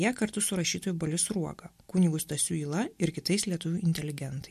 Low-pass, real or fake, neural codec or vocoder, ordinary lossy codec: 14.4 kHz; real; none; MP3, 64 kbps